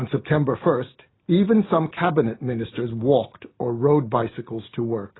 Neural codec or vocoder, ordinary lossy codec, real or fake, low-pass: none; AAC, 16 kbps; real; 7.2 kHz